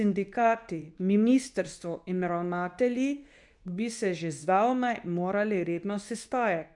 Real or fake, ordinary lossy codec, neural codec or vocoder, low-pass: fake; none; codec, 24 kHz, 0.9 kbps, WavTokenizer, medium speech release version 2; 10.8 kHz